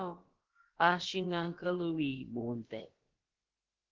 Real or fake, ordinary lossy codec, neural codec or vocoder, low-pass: fake; Opus, 16 kbps; codec, 16 kHz, about 1 kbps, DyCAST, with the encoder's durations; 7.2 kHz